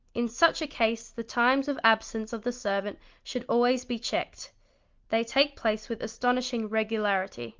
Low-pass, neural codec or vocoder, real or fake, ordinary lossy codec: 7.2 kHz; none; real; Opus, 32 kbps